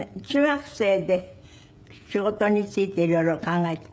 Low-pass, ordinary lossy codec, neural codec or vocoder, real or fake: none; none; codec, 16 kHz, 16 kbps, FreqCodec, smaller model; fake